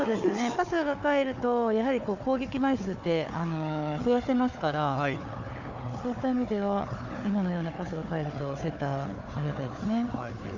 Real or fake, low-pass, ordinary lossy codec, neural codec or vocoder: fake; 7.2 kHz; none; codec, 16 kHz, 4 kbps, FunCodec, trained on Chinese and English, 50 frames a second